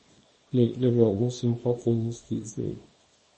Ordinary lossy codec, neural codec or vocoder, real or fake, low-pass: MP3, 32 kbps; codec, 24 kHz, 0.9 kbps, WavTokenizer, small release; fake; 10.8 kHz